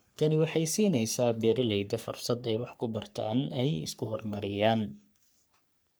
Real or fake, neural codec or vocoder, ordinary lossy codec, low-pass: fake; codec, 44.1 kHz, 3.4 kbps, Pupu-Codec; none; none